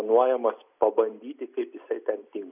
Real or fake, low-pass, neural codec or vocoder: real; 3.6 kHz; none